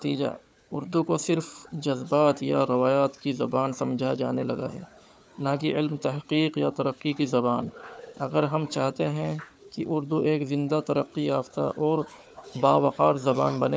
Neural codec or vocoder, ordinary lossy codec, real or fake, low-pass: codec, 16 kHz, 4 kbps, FunCodec, trained on Chinese and English, 50 frames a second; none; fake; none